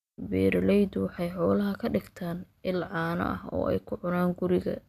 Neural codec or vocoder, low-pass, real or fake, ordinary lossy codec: none; 14.4 kHz; real; none